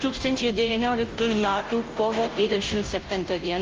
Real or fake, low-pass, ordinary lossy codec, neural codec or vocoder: fake; 7.2 kHz; Opus, 24 kbps; codec, 16 kHz, 0.5 kbps, FunCodec, trained on Chinese and English, 25 frames a second